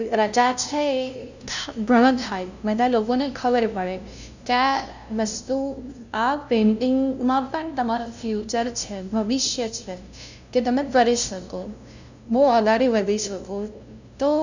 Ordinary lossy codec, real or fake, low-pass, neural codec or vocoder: none; fake; 7.2 kHz; codec, 16 kHz, 0.5 kbps, FunCodec, trained on LibriTTS, 25 frames a second